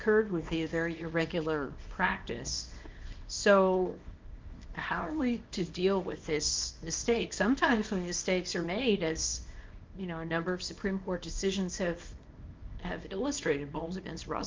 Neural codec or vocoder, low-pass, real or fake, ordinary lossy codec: codec, 24 kHz, 0.9 kbps, WavTokenizer, small release; 7.2 kHz; fake; Opus, 24 kbps